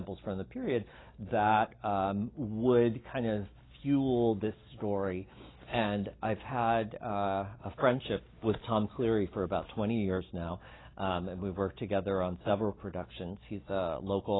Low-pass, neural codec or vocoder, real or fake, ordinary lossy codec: 7.2 kHz; none; real; AAC, 16 kbps